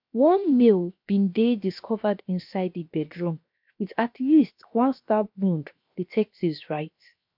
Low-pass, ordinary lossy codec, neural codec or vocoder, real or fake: 5.4 kHz; MP3, 48 kbps; codec, 16 kHz, 0.7 kbps, FocalCodec; fake